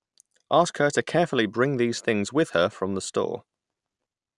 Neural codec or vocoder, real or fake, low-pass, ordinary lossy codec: none; real; 10.8 kHz; none